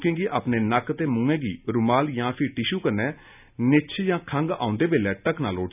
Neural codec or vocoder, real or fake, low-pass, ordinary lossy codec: none; real; 3.6 kHz; none